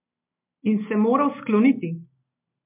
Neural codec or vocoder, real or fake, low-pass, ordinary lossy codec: none; real; 3.6 kHz; MP3, 32 kbps